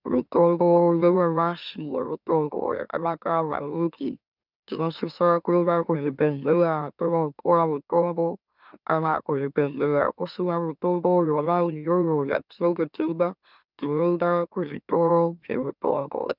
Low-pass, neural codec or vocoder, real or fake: 5.4 kHz; autoencoder, 44.1 kHz, a latent of 192 numbers a frame, MeloTTS; fake